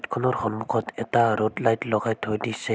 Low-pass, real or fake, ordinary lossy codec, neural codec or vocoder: none; real; none; none